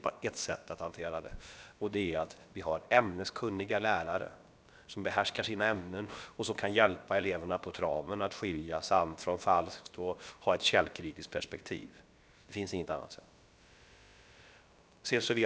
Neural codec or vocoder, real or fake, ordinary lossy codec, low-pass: codec, 16 kHz, about 1 kbps, DyCAST, with the encoder's durations; fake; none; none